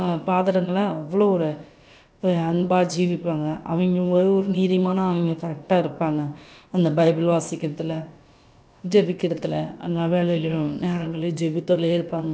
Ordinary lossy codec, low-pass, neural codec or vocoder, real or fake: none; none; codec, 16 kHz, about 1 kbps, DyCAST, with the encoder's durations; fake